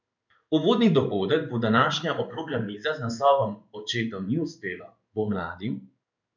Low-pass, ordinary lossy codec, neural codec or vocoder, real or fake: 7.2 kHz; none; codec, 16 kHz in and 24 kHz out, 1 kbps, XY-Tokenizer; fake